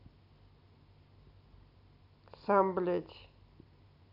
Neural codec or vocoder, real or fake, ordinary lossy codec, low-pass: none; real; none; 5.4 kHz